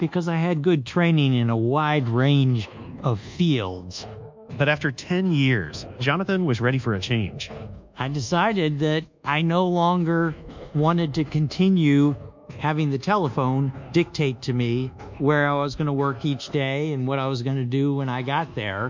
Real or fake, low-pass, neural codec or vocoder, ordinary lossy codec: fake; 7.2 kHz; codec, 24 kHz, 1.2 kbps, DualCodec; MP3, 64 kbps